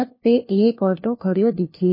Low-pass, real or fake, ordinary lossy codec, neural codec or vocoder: 5.4 kHz; fake; MP3, 24 kbps; codec, 16 kHz, 1 kbps, FunCodec, trained on LibriTTS, 50 frames a second